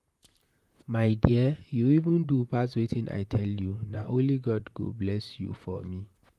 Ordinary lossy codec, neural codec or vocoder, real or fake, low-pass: Opus, 32 kbps; vocoder, 44.1 kHz, 128 mel bands, Pupu-Vocoder; fake; 14.4 kHz